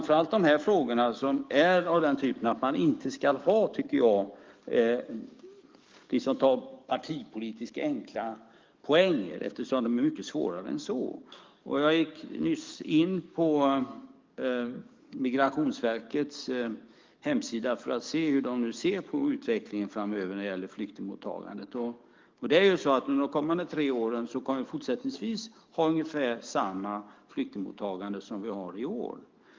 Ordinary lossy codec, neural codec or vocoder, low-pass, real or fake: Opus, 24 kbps; codec, 44.1 kHz, 7.8 kbps, DAC; 7.2 kHz; fake